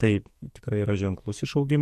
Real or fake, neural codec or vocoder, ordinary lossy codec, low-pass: fake; codec, 44.1 kHz, 2.6 kbps, SNAC; MP3, 64 kbps; 14.4 kHz